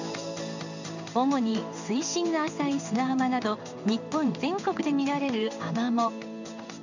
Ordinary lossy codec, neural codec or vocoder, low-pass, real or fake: none; codec, 16 kHz in and 24 kHz out, 1 kbps, XY-Tokenizer; 7.2 kHz; fake